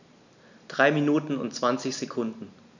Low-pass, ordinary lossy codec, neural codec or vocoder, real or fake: 7.2 kHz; none; vocoder, 44.1 kHz, 128 mel bands every 512 samples, BigVGAN v2; fake